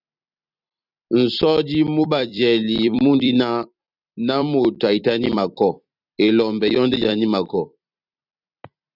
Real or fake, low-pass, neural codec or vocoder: fake; 5.4 kHz; vocoder, 44.1 kHz, 128 mel bands every 256 samples, BigVGAN v2